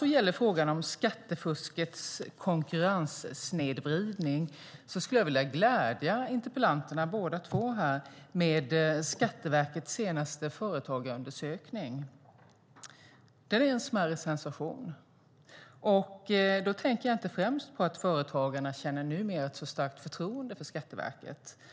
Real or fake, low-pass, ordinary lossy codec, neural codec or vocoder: real; none; none; none